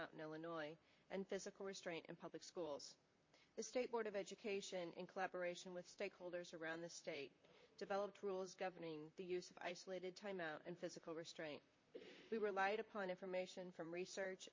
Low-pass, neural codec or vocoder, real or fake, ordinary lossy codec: 7.2 kHz; vocoder, 44.1 kHz, 128 mel bands, Pupu-Vocoder; fake; MP3, 32 kbps